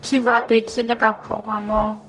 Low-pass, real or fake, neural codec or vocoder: 10.8 kHz; fake; codec, 44.1 kHz, 0.9 kbps, DAC